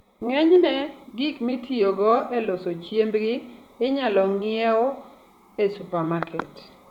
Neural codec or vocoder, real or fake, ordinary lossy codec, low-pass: vocoder, 44.1 kHz, 128 mel bands, Pupu-Vocoder; fake; none; 19.8 kHz